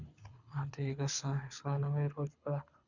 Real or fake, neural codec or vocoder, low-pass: fake; codec, 24 kHz, 6 kbps, HILCodec; 7.2 kHz